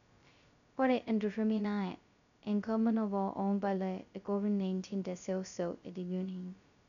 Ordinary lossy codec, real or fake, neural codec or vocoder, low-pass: none; fake; codec, 16 kHz, 0.2 kbps, FocalCodec; 7.2 kHz